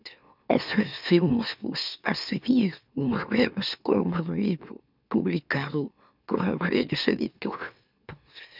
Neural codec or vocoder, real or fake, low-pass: autoencoder, 44.1 kHz, a latent of 192 numbers a frame, MeloTTS; fake; 5.4 kHz